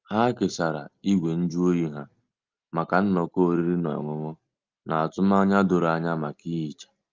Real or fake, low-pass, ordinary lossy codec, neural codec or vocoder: real; 7.2 kHz; Opus, 24 kbps; none